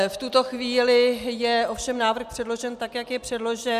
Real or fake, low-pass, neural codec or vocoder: real; 14.4 kHz; none